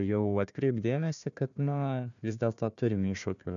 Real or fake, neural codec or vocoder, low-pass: fake; codec, 16 kHz, 1 kbps, FunCodec, trained on Chinese and English, 50 frames a second; 7.2 kHz